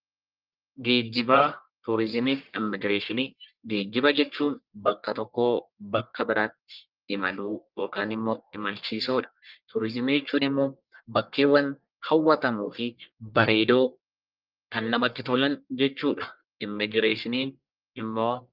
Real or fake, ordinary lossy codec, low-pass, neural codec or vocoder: fake; Opus, 24 kbps; 5.4 kHz; codec, 44.1 kHz, 1.7 kbps, Pupu-Codec